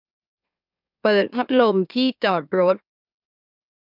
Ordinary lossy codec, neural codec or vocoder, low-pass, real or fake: none; autoencoder, 44.1 kHz, a latent of 192 numbers a frame, MeloTTS; 5.4 kHz; fake